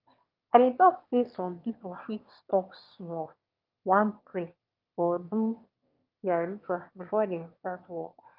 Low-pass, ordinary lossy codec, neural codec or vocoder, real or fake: 5.4 kHz; Opus, 24 kbps; autoencoder, 22.05 kHz, a latent of 192 numbers a frame, VITS, trained on one speaker; fake